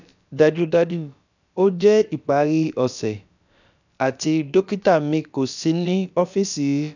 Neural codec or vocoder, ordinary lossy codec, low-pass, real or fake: codec, 16 kHz, about 1 kbps, DyCAST, with the encoder's durations; none; 7.2 kHz; fake